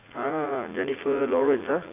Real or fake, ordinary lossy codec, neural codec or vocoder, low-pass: fake; AAC, 24 kbps; vocoder, 44.1 kHz, 80 mel bands, Vocos; 3.6 kHz